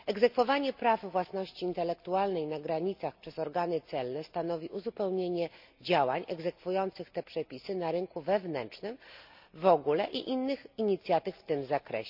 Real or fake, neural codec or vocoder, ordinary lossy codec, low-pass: real; none; MP3, 48 kbps; 5.4 kHz